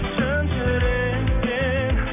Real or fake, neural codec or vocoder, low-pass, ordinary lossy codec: real; none; 3.6 kHz; none